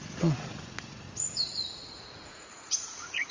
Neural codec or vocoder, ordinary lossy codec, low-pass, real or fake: vocoder, 44.1 kHz, 80 mel bands, Vocos; Opus, 32 kbps; 7.2 kHz; fake